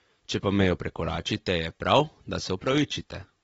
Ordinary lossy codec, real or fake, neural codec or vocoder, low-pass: AAC, 24 kbps; real; none; 19.8 kHz